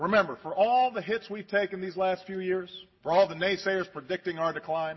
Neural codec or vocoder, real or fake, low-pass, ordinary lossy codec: none; real; 7.2 kHz; MP3, 24 kbps